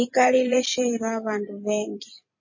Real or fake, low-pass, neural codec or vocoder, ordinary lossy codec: fake; 7.2 kHz; vocoder, 44.1 kHz, 128 mel bands every 256 samples, BigVGAN v2; MP3, 32 kbps